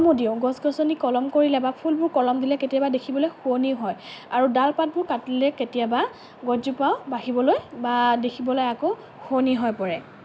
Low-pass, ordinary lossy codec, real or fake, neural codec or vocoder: none; none; real; none